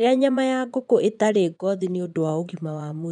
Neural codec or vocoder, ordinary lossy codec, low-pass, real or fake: vocoder, 22.05 kHz, 80 mel bands, Vocos; none; 9.9 kHz; fake